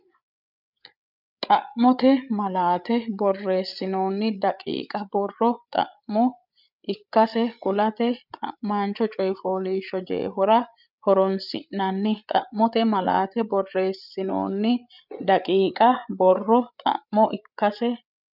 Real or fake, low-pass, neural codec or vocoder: fake; 5.4 kHz; codec, 16 kHz, 8 kbps, FreqCodec, larger model